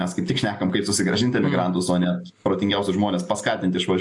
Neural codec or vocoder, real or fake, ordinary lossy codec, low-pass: none; real; AAC, 64 kbps; 10.8 kHz